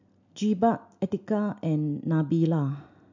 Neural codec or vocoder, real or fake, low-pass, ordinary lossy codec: none; real; 7.2 kHz; MP3, 64 kbps